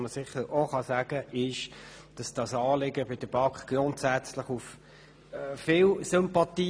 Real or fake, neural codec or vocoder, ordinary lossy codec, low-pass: real; none; none; none